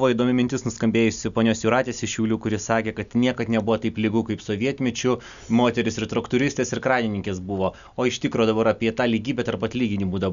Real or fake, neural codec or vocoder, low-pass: real; none; 7.2 kHz